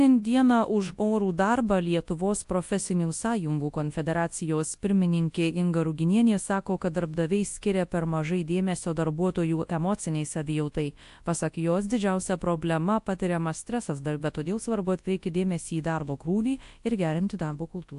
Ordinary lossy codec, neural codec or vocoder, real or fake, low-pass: AAC, 64 kbps; codec, 24 kHz, 0.9 kbps, WavTokenizer, large speech release; fake; 10.8 kHz